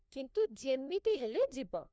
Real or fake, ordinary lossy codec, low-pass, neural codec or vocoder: fake; none; none; codec, 16 kHz, 1 kbps, FunCodec, trained on LibriTTS, 50 frames a second